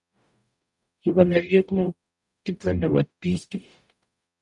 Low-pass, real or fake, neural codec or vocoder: 10.8 kHz; fake; codec, 44.1 kHz, 0.9 kbps, DAC